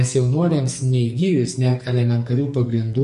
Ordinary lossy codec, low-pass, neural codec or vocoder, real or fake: MP3, 48 kbps; 14.4 kHz; codec, 44.1 kHz, 2.6 kbps, SNAC; fake